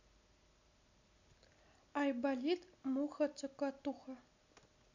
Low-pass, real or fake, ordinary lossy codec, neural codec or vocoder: 7.2 kHz; real; none; none